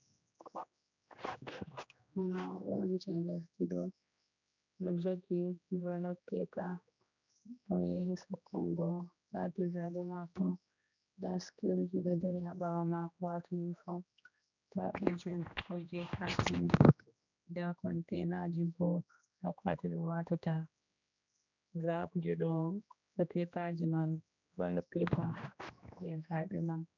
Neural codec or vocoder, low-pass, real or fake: codec, 16 kHz, 1 kbps, X-Codec, HuBERT features, trained on general audio; 7.2 kHz; fake